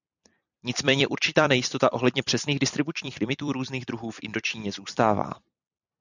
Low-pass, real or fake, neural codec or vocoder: 7.2 kHz; fake; vocoder, 44.1 kHz, 128 mel bands every 256 samples, BigVGAN v2